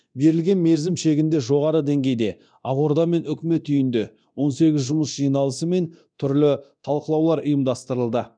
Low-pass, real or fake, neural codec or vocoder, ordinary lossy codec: 9.9 kHz; fake; codec, 24 kHz, 0.9 kbps, DualCodec; none